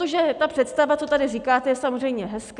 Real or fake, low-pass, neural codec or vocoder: real; 10.8 kHz; none